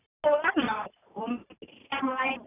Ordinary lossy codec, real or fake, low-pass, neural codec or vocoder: none; fake; 3.6 kHz; vocoder, 44.1 kHz, 128 mel bands every 512 samples, BigVGAN v2